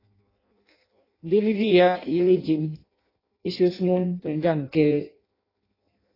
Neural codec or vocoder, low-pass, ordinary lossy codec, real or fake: codec, 16 kHz in and 24 kHz out, 0.6 kbps, FireRedTTS-2 codec; 5.4 kHz; AAC, 24 kbps; fake